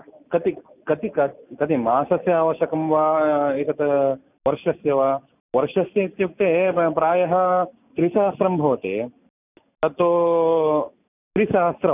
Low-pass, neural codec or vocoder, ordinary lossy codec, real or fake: 3.6 kHz; none; none; real